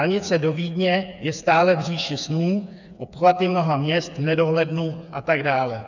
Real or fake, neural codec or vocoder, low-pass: fake; codec, 16 kHz, 4 kbps, FreqCodec, smaller model; 7.2 kHz